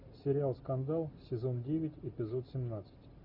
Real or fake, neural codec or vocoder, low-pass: real; none; 5.4 kHz